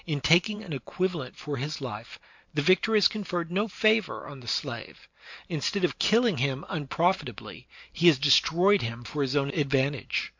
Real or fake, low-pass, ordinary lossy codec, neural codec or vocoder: fake; 7.2 kHz; MP3, 48 kbps; vocoder, 44.1 kHz, 128 mel bands every 512 samples, BigVGAN v2